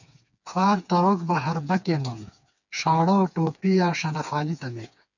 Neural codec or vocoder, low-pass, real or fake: codec, 16 kHz, 4 kbps, FreqCodec, smaller model; 7.2 kHz; fake